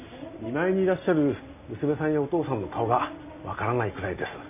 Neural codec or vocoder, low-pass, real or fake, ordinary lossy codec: none; 3.6 kHz; real; none